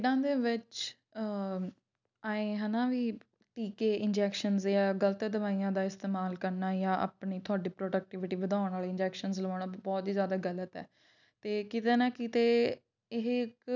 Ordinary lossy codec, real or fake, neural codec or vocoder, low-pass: none; real; none; 7.2 kHz